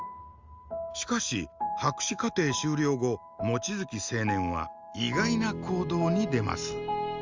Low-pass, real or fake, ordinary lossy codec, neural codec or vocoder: 7.2 kHz; real; Opus, 32 kbps; none